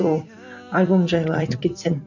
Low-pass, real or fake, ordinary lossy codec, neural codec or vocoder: 7.2 kHz; fake; none; vocoder, 44.1 kHz, 128 mel bands every 256 samples, BigVGAN v2